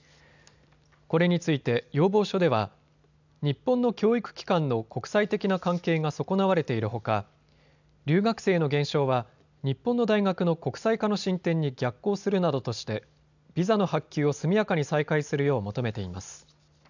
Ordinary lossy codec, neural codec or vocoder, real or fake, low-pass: none; none; real; 7.2 kHz